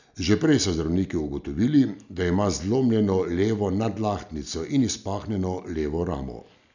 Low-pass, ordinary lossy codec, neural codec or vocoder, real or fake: 7.2 kHz; none; none; real